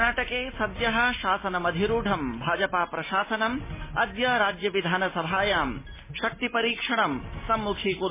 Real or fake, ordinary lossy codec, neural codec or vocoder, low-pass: real; MP3, 16 kbps; none; 3.6 kHz